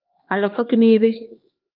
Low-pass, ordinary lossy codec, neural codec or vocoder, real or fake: 5.4 kHz; Opus, 24 kbps; codec, 16 kHz, 2 kbps, X-Codec, HuBERT features, trained on LibriSpeech; fake